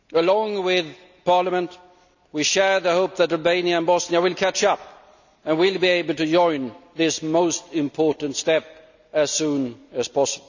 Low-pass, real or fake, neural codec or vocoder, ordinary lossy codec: 7.2 kHz; real; none; none